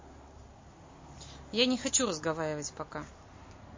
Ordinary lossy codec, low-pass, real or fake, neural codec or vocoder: MP3, 32 kbps; 7.2 kHz; real; none